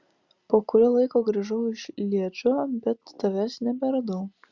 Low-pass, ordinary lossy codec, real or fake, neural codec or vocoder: 7.2 kHz; Opus, 64 kbps; real; none